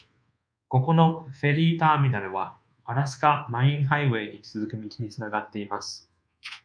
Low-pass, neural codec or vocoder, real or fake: 9.9 kHz; codec, 24 kHz, 1.2 kbps, DualCodec; fake